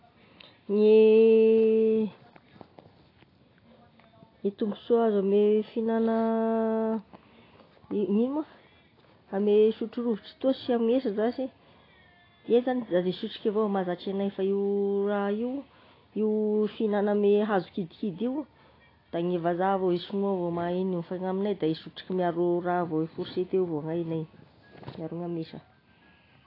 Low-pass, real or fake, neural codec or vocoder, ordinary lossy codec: 5.4 kHz; real; none; AAC, 24 kbps